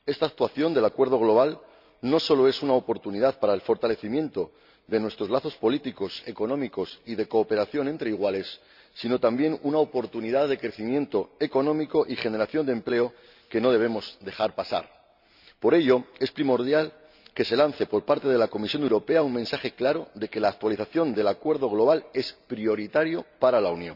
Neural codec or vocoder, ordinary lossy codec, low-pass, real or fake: none; none; 5.4 kHz; real